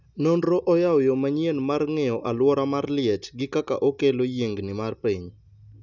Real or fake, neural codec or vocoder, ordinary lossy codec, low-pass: real; none; none; 7.2 kHz